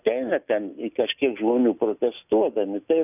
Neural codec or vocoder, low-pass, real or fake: none; 3.6 kHz; real